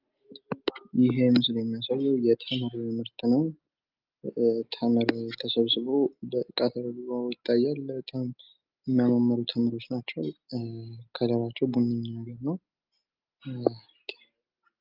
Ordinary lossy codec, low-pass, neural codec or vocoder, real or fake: Opus, 24 kbps; 5.4 kHz; none; real